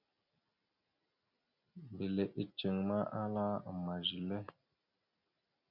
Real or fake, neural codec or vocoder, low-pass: real; none; 5.4 kHz